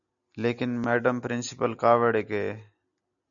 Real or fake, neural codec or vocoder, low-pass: real; none; 7.2 kHz